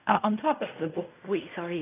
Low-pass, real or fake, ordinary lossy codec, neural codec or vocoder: 3.6 kHz; fake; none; codec, 16 kHz in and 24 kHz out, 0.4 kbps, LongCat-Audio-Codec, fine tuned four codebook decoder